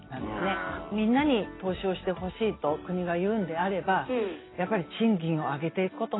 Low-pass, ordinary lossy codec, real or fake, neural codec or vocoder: 7.2 kHz; AAC, 16 kbps; real; none